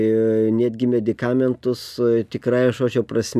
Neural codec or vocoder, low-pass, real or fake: none; 14.4 kHz; real